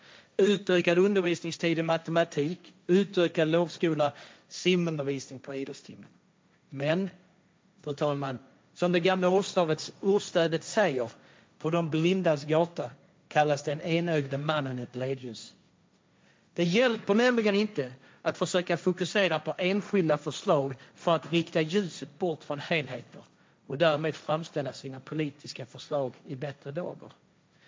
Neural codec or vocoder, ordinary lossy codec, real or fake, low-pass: codec, 16 kHz, 1.1 kbps, Voila-Tokenizer; none; fake; none